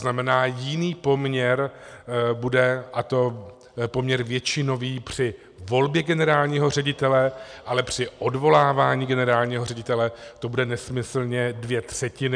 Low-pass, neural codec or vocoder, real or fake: 9.9 kHz; none; real